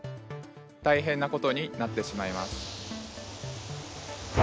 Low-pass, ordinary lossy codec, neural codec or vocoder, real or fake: none; none; none; real